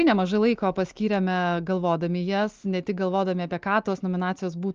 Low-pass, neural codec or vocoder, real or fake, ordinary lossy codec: 7.2 kHz; none; real; Opus, 32 kbps